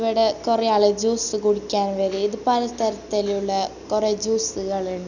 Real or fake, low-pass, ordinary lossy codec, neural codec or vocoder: real; 7.2 kHz; none; none